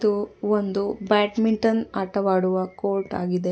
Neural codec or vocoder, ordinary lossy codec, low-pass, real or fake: none; none; none; real